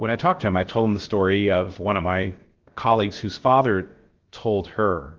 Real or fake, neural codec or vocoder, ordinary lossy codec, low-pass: fake; codec, 16 kHz, about 1 kbps, DyCAST, with the encoder's durations; Opus, 16 kbps; 7.2 kHz